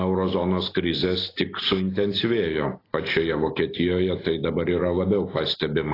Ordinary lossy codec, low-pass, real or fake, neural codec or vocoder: AAC, 24 kbps; 5.4 kHz; real; none